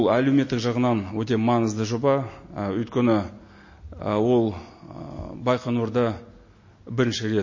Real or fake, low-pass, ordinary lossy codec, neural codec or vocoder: real; 7.2 kHz; MP3, 32 kbps; none